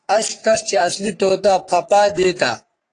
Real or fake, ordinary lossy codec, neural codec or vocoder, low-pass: fake; AAC, 48 kbps; codec, 44.1 kHz, 3.4 kbps, Pupu-Codec; 10.8 kHz